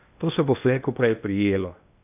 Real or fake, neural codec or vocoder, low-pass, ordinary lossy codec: fake; codec, 16 kHz in and 24 kHz out, 0.8 kbps, FocalCodec, streaming, 65536 codes; 3.6 kHz; AAC, 32 kbps